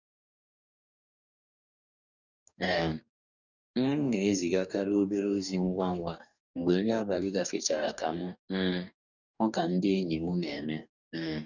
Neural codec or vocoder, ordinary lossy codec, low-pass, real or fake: codec, 44.1 kHz, 2.6 kbps, DAC; none; 7.2 kHz; fake